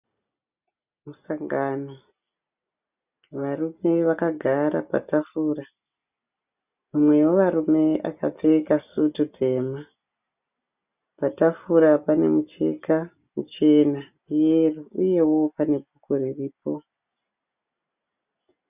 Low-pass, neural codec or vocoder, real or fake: 3.6 kHz; none; real